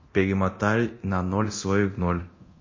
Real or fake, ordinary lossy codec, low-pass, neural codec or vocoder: fake; MP3, 32 kbps; 7.2 kHz; codec, 24 kHz, 0.9 kbps, DualCodec